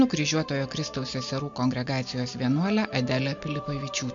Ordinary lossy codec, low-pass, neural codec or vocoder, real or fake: MP3, 48 kbps; 7.2 kHz; none; real